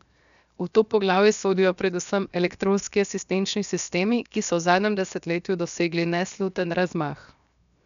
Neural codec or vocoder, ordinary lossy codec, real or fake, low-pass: codec, 16 kHz, 0.7 kbps, FocalCodec; none; fake; 7.2 kHz